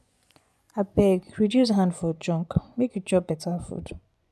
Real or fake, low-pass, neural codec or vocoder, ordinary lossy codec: fake; none; vocoder, 24 kHz, 100 mel bands, Vocos; none